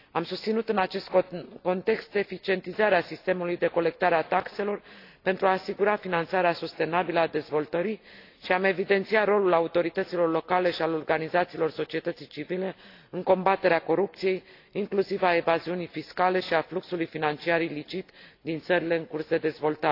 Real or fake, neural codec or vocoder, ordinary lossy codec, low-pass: real; none; AAC, 32 kbps; 5.4 kHz